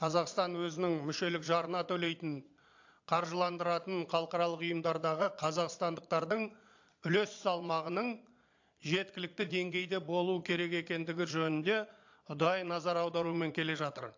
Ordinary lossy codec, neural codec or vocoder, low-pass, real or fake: AAC, 48 kbps; vocoder, 22.05 kHz, 80 mel bands, Vocos; 7.2 kHz; fake